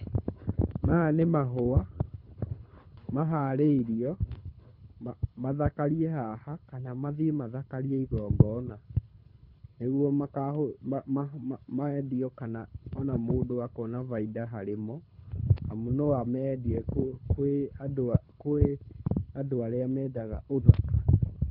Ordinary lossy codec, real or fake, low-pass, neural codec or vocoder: none; fake; 5.4 kHz; codec, 24 kHz, 6 kbps, HILCodec